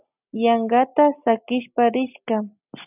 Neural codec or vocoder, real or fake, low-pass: none; real; 3.6 kHz